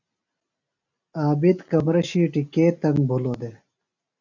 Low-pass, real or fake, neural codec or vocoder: 7.2 kHz; real; none